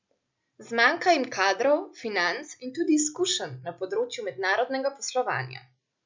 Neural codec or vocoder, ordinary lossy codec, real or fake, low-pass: none; MP3, 64 kbps; real; 7.2 kHz